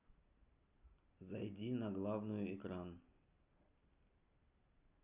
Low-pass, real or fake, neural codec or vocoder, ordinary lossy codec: 3.6 kHz; real; none; Opus, 64 kbps